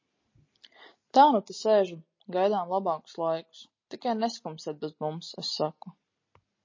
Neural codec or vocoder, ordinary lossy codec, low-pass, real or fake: none; MP3, 32 kbps; 7.2 kHz; real